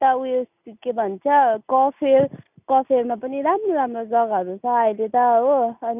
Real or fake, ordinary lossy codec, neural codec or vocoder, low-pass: real; none; none; 3.6 kHz